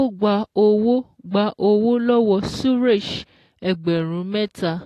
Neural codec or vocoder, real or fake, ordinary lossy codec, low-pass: none; real; AAC, 48 kbps; 14.4 kHz